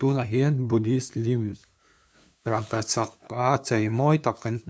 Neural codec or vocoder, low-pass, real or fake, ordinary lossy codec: codec, 16 kHz, 2 kbps, FunCodec, trained on LibriTTS, 25 frames a second; none; fake; none